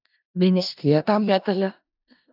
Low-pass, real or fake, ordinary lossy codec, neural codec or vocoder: 5.4 kHz; fake; AAC, 48 kbps; codec, 16 kHz in and 24 kHz out, 0.4 kbps, LongCat-Audio-Codec, four codebook decoder